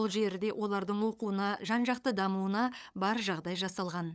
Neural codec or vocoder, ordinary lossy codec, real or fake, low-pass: codec, 16 kHz, 8 kbps, FunCodec, trained on LibriTTS, 25 frames a second; none; fake; none